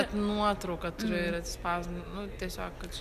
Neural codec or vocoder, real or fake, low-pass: none; real; 14.4 kHz